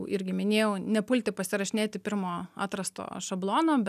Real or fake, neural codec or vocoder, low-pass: real; none; 14.4 kHz